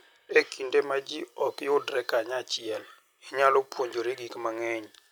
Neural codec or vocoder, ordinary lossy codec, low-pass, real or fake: none; none; none; real